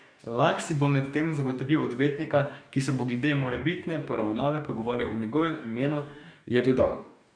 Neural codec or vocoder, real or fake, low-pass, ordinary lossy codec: codec, 44.1 kHz, 2.6 kbps, DAC; fake; 9.9 kHz; none